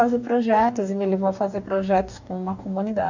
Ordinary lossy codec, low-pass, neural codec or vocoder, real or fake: none; 7.2 kHz; codec, 44.1 kHz, 2.6 kbps, DAC; fake